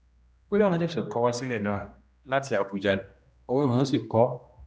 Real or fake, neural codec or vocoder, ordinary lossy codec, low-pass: fake; codec, 16 kHz, 1 kbps, X-Codec, HuBERT features, trained on general audio; none; none